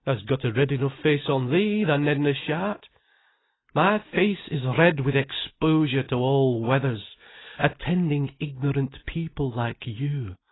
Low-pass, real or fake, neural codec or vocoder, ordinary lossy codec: 7.2 kHz; real; none; AAC, 16 kbps